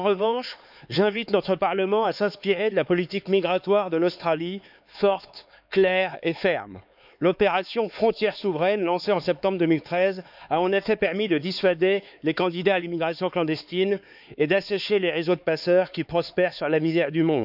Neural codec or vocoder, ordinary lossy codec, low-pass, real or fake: codec, 16 kHz, 4 kbps, X-Codec, HuBERT features, trained on LibriSpeech; none; 5.4 kHz; fake